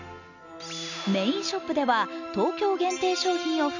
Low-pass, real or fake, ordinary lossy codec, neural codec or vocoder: 7.2 kHz; real; none; none